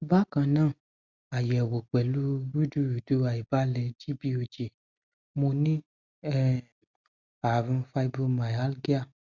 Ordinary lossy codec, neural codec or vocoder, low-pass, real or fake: Opus, 64 kbps; none; 7.2 kHz; real